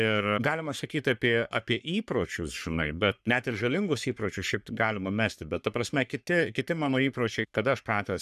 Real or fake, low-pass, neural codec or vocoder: fake; 14.4 kHz; codec, 44.1 kHz, 3.4 kbps, Pupu-Codec